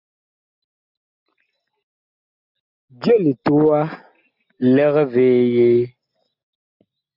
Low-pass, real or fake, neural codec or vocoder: 5.4 kHz; real; none